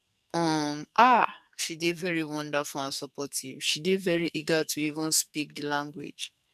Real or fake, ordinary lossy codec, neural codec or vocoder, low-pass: fake; none; codec, 44.1 kHz, 2.6 kbps, SNAC; 14.4 kHz